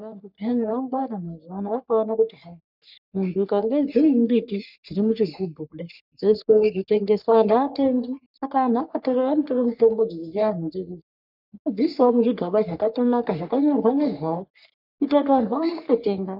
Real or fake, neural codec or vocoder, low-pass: fake; codec, 44.1 kHz, 3.4 kbps, Pupu-Codec; 5.4 kHz